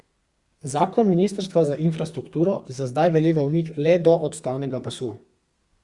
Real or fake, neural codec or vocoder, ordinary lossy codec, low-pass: fake; codec, 32 kHz, 1.9 kbps, SNAC; Opus, 64 kbps; 10.8 kHz